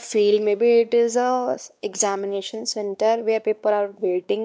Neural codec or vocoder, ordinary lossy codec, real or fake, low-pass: codec, 16 kHz, 2 kbps, X-Codec, WavLM features, trained on Multilingual LibriSpeech; none; fake; none